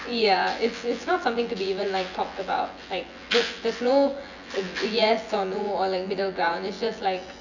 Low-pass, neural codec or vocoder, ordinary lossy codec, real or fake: 7.2 kHz; vocoder, 24 kHz, 100 mel bands, Vocos; none; fake